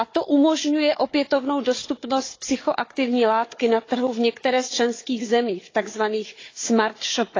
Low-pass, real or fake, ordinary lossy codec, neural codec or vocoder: 7.2 kHz; fake; AAC, 32 kbps; codec, 16 kHz, 4 kbps, FunCodec, trained on Chinese and English, 50 frames a second